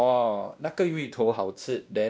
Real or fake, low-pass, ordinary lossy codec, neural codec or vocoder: fake; none; none; codec, 16 kHz, 1 kbps, X-Codec, WavLM features, trained on Multilingual LibriSpeech